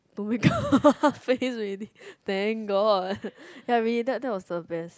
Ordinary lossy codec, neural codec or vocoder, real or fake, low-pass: none; none; real; none